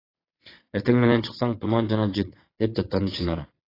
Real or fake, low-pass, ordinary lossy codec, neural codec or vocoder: fake; 5.4 kHz; AAC, 24 kbps; vocoder, 22.05 kHz, 80 mel bands, Vocos